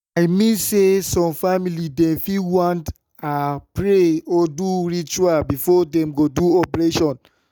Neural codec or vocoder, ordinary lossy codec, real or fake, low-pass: none; none; real; none